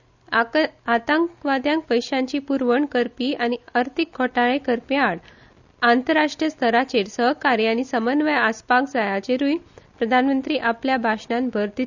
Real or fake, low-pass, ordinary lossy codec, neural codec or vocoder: real; 7.2 kHz; none; none